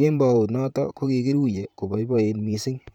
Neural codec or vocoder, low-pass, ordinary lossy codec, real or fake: vocoder, 44.1 kHz, 128 mel bands, Pupu-Vocoder; 19.8 kHz; none; fake